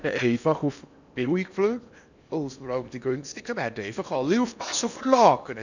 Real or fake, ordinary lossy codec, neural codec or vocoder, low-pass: fake; none; codec, 16 kHz in and 24 kHz out, 0.8 kbps, FocalCodec, streaming, 65536 codes; 7.2 kHz